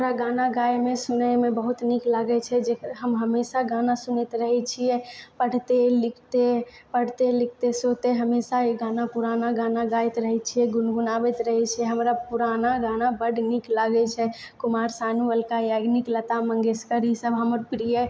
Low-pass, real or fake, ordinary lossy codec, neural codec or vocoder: none; real; none; none